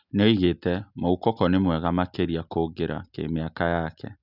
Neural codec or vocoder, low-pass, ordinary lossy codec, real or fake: none; 5.4 kHz; none; real